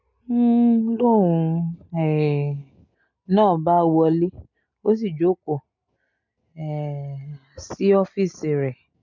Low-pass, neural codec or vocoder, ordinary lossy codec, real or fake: 7.2 kHz; none; MP3, 48 kbps; real